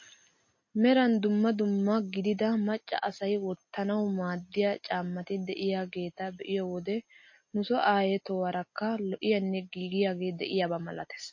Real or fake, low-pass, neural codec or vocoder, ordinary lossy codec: real; 7.2 kHz; none; MP3, 32 kbps